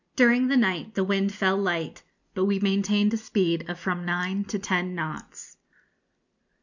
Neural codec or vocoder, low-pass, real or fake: none; 7.2 kHz; real